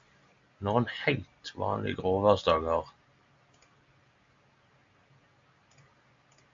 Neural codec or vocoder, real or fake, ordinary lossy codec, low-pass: none; real; MP3, 48 kbps; 7.2 kHz